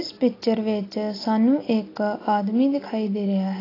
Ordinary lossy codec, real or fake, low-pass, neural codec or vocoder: AAC, 24 kbps; real; 5.4 kHz; none